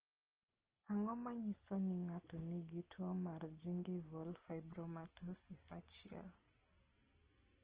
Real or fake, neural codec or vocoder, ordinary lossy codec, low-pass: real; none; Opus, 16 kbps; 3.6 kHz